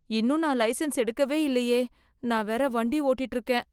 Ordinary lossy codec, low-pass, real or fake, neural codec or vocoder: Opus, 24 kbps; 19.8 kHz; fake; autoencoder, 48 kHz, 128 numbers a frame, DAC-VAE, trained on Japanese speech